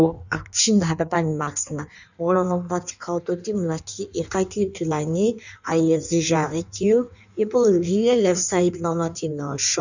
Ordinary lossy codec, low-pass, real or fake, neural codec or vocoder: none; 7.2 kHz; fake; codec, 16 kHz in and 24 kHz out, 1.1 kbps, FireRedTTS-2 codec